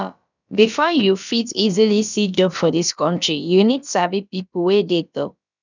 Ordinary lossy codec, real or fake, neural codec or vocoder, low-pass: none; fake; codec, 16 kHz, about 1 kbps, DyCAST, with the encoder's durations; 7.2 kHz